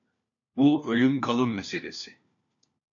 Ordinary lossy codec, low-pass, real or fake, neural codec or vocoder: AAC, 64 kbps; 7.2 kHz; fake; codec, 16 kHz, 1 kbps, FunCodec, trained on LibriTTS, 50 frames a second